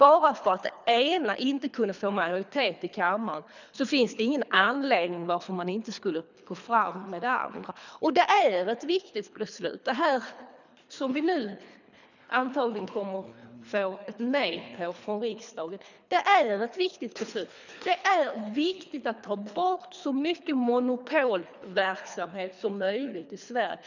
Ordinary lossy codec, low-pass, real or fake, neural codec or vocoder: none; 7.2 kHz; fake; codec, 24 kHz, 3 kbps, HILCodec